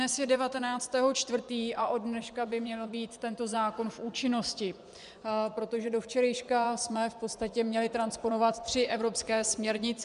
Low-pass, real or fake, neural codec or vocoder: 10.8 kHz; fake; vocoder, 24 kHz, 100 mel bands, Vocos